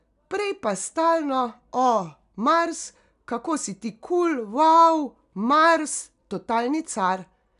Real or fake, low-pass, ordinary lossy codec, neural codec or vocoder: real; 10.8 kHz; none; none